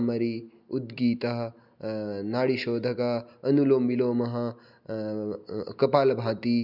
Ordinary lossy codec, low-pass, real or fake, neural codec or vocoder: none; 5.4 kHz; real; none